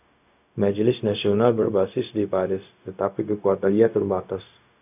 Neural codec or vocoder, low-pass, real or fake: codec, 16 kHz, 0.4 kbps, LongCat-Audio-Codec; 3.6 kHz; fake